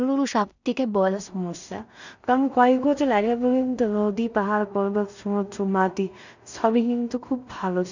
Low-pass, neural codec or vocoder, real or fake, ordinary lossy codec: 7.2 kHz; codec, 16 kHz in and 24 kHz out, 0.4 kbps, LongCat-Audio-Codec, two codebook decoder; fake; none